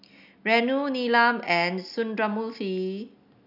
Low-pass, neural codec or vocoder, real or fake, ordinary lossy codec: 5.4 kHz; none; real; none